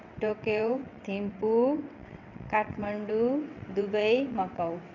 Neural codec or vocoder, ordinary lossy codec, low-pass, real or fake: vocoder, 22.05 kHz, 80 mel bands, WaveNeXt; none; 7.2 kHz; fake